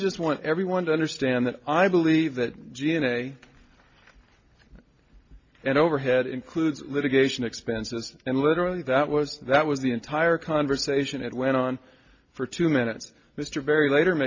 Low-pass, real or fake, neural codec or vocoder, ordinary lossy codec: 7.2 kHz; real; none; MP3, 48 kbps